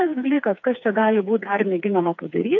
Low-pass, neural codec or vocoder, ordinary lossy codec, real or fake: 7.2 kHz; codec, 16 kHz, 4 kbps, FreqCodec, smaller model; MP3, 64 kbps; fake